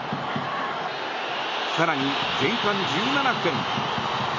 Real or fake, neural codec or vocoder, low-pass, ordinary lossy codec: real; none; 7.2 kHz; none